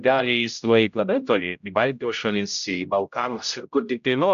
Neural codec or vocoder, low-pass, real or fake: codec, 16 kHz, 0.5 kbps, X-Codec, HuBERT features, trained on general audio; 7.2 kHz; fake